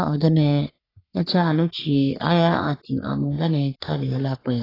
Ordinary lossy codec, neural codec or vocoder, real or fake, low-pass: AAC, 24 kbps; codec, 44.1 kHz, 3.4 kbps, Pupu-Codec; fake; 5.4 kHz